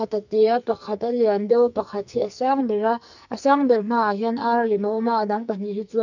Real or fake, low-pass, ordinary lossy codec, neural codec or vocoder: fake; 7.2 kHz; none; codec, 44.1 kHz, 2.6 kbps, SNAC